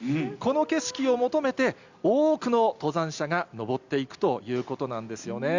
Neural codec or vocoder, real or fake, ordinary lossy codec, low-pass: none; real; Opus, 64 kbps; 7.2 kHz